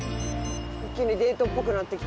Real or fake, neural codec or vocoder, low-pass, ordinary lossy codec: real; none; none; none